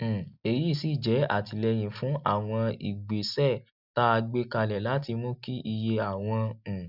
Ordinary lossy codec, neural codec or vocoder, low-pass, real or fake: none; none; 5.4 kHz; real